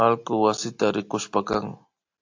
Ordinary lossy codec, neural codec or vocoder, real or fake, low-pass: AAC, 48 kbps; none; real; 7.2 kHz